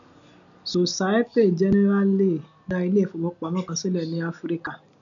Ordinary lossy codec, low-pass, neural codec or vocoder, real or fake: none; 7.2 kHz; none; real